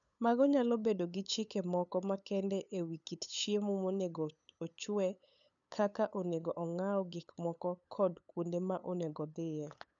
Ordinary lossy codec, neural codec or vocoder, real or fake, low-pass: none; codec, 16 kHz, 8 kbps, FunCodec, trained on LibriTTS, 25 frames a second; fake; 7.2 kHz